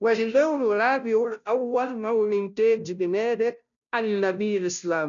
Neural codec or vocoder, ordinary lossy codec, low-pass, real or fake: codec, 16 kHz, 0.5 kbps, FunCodec, trained on Chinese and English, 25 frames a second; none; 7.2 kHz; fake